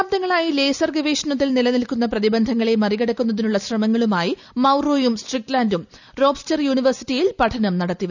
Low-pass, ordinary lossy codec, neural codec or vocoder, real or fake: 7.2 kHz; none; none; real